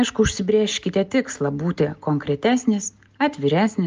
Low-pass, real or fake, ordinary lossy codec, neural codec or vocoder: 7.2 kHz; real; Opus, 24 kbps; none